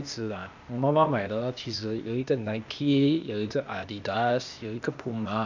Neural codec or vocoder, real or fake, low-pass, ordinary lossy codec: codec, 16 kHz, 0.8 kbps, ZipCodec; fake; 7.2 kHz; none